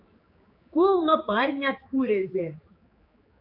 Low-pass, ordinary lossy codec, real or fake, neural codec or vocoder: 5.4 kHz; MP3, 32 kbps; fake; codec, 16 kHz, 4 kbps, X-Codec, HuBERT features, trained on general audio